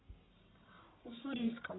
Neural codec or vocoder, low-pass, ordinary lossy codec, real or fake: codec, 44.1 kHz, 1.7 kbps, Pupu-Codec; 7.2 kHz; AAC, 16 kbps; fake